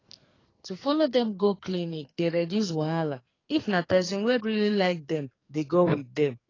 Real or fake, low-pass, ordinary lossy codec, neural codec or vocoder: fake; 7.2 kHz; AAC, 32 kbps; codec, 44.1 kHz, 2.6 kbps, SNAC